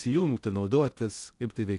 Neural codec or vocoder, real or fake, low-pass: codec, 16 kHz in and 24 kHz out, 0.6 kbps, FocalCodec, streaming, 2048 codes; fake; 10.8 kHz